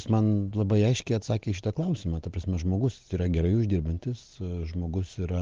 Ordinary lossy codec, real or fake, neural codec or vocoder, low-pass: Opus, 16 kbps; real; none; 7.2 kHz